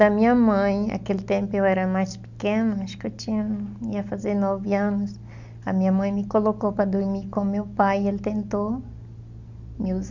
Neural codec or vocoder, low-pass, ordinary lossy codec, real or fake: none; 7.2 kHz; none; real